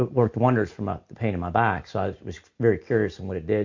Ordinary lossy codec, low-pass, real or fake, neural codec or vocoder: MP3, 48 kbps; 7.2 kHz; real; none